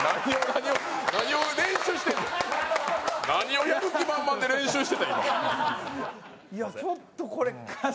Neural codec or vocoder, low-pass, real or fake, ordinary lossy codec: none; none; real; none